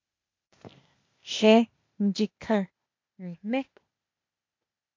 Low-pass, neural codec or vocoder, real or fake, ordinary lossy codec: 7.2 kHz; codec, 16 kHz, 0.8 kbps, ZipCodec; fake; MP3, 48 kbps